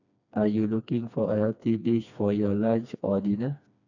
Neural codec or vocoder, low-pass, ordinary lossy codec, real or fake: codec, 16 kHz, 2 kbps, FreqCodec, smaller model; 7.2 kHz; none; fake